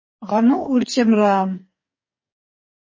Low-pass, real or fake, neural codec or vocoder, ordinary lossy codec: 7.2 kHz; fake; codec, 44.1 kHz, 2.6 kbps, DAC; MP3, 32 kbps